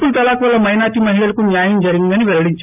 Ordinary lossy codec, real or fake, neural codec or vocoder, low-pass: AAC, 32 kbps; real; none; 3.6 kHz